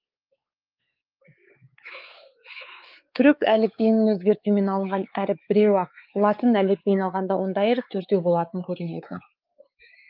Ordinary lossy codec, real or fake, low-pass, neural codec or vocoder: Opus, 24 kbps; fake; 5.4 kHz; codec, 16 kHz, 4 kbps, X-Codec, WavLM features, trained on Multilingual LibriSpeech